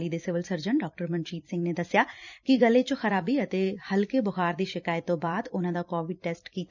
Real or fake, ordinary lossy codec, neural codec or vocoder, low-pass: fake; none; vocoder, 44.1 kHz, 128 mel bands every 256 samples, BigVGAN v2; 7.2 kHz